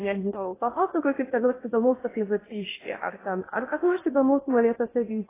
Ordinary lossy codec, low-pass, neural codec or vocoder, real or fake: AAC, 16 kbps; 3.6 kHz; codec, 16 kHz in and 24 kHz out, 0.8 kbps, FocalCodec, streaming, 65536 codes; fake